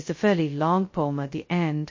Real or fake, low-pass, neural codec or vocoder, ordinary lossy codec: fake; 7.2 kHz; codec, 16 kHz, 0.2 kbps, FocalCodec; MP3, 32 kbps